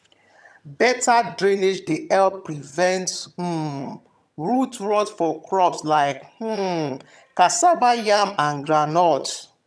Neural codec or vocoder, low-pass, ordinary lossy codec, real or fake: vocoder, 22.05 kHz, 80 mel bands, HiFi-GAN; none; none; fake